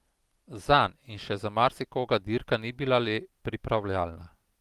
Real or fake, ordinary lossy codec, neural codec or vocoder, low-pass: real; Opus, 24 kbps; none; 14.4 kHz